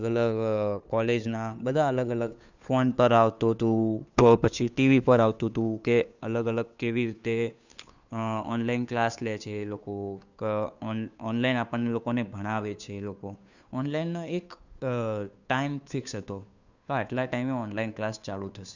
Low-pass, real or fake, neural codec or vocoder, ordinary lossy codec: 7.2 kHz; fake; codec, 16 kHz, 2 kbps, FunCodec, trained on Chinese and English, 25 frames a second; none